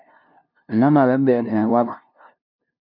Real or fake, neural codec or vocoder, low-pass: fake; codec, 16 kHz, 0.5 kbps, FunCodec, trained on LibriTTS, 25 frames a second; 5.4 kHz